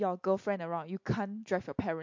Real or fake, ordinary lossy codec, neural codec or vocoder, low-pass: real; MP3, 48 kbps; none; 7.2 kHz